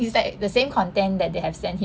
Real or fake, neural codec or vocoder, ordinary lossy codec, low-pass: real; none; none; none